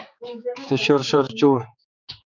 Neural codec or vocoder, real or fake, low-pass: codec, 16 kHz, 4 kbps, X-Codec, HuBERT features, trained on general audio; fake; 7.2 kHz